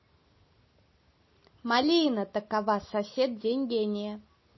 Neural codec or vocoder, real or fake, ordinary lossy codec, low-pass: none; real; MP3, 24 kbps; 7.2 kHz